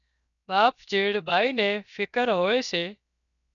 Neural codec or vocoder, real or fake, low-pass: codec, 16 kHz, 0.7 kbps, FocalCodec; fake; 7.2 kHz